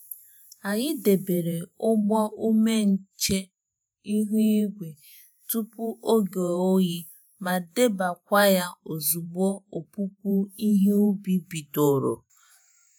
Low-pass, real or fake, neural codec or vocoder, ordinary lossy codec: none; fake; vocoder, 48 kHz, 128 mel bands, Vocos; none